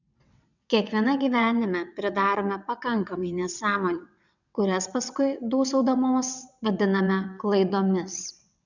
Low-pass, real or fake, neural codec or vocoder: 7.2 kHz; fake; vocoder, 22.05 kHz, 80 mel bands, WaveNeXt